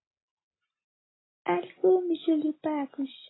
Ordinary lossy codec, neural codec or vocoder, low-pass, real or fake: AAC, 16 kbps; none; 7.2 kHz; real